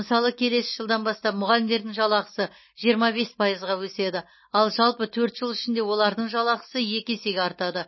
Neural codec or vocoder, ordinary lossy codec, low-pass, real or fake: none; MP3, 24 kbps; 7.2 kHz; real